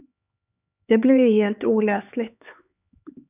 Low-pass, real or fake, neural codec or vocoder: 3.6 kHz; fake; codec, 16 kHz, 4 kbps, X-Codec, HuBERT features, trained on LibriSpeech